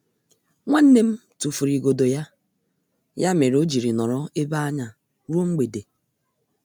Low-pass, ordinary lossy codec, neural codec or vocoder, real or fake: none; none; none; real